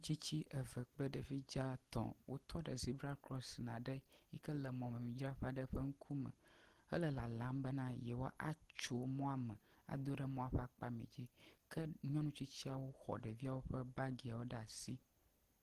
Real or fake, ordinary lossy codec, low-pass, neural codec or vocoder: real; Opus, 16 kbps; 14.4 kHz; none